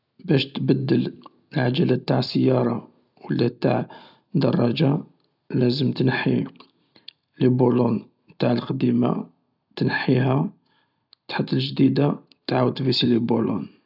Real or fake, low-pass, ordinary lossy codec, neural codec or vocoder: real; 5.4 kHz; none; none